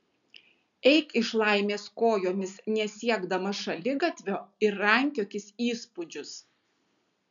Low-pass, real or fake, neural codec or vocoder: 7.2 kHz; real; none